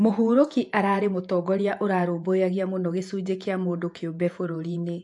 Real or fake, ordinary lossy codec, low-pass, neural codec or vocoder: fake; AAC, 64 kbps; 10.8 kHz; vocoder, 48 kHz, 128 mel bands, Vocos